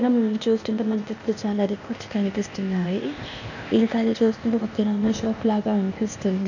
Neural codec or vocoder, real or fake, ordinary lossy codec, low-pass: codec, 16 kHz, 0.8 kbps, ZipCodec; fake; none; 7.2 kHz